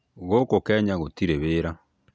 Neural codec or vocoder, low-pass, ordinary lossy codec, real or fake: none; none; none; real